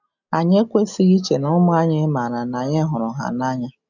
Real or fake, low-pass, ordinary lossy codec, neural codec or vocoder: real; 7.2 kHz; none; none